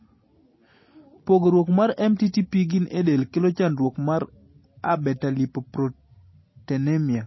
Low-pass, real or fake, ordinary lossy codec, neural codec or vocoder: 7.2 kHz; real; MP3, 24 kbps; none